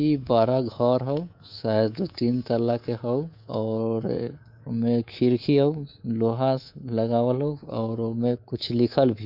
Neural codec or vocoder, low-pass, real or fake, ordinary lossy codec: codec, 24 kHz, 3.1 kbps, DualCodec; 5.4 kHz; fake; none